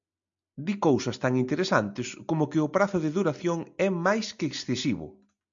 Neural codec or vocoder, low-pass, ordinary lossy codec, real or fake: none; 7.2 kHz; MP3, 64 kbps; real